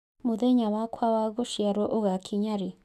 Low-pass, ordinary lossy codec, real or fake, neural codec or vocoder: 14.4 kHz; none; fake; autoencoder, 48 kHz, 128 numbers a frame, DAC-VAE, trained on Japanese speech